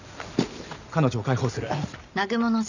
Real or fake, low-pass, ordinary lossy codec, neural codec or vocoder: real; 7.2 kHz; none; none